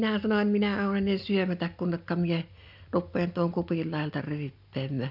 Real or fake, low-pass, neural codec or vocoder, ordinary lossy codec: real; 5.4 kHz; none; none